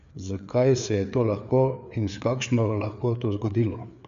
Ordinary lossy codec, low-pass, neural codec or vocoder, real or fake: AAC, 96 kbps; 7.2 kHz; codec, 16 kHz, 4 kbps, FreqCodec, larger model; fake